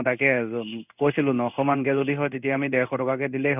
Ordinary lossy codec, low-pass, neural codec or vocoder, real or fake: none; 3.6 kHz; codec, 16 kHz in and 24 kHz out, 1 kbps, XY-Tokenizer; fake